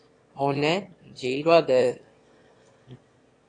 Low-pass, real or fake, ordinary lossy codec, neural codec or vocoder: 9.9 kHz; fake; AAC, 32 kbps; autoencoder, 22.05 kHz, a latent of 192 numbers a frame, VITS, trained on one speaker